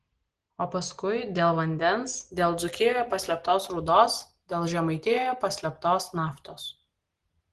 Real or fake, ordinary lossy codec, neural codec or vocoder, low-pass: real; Opus, 16 kbps; none; 10.8 kHz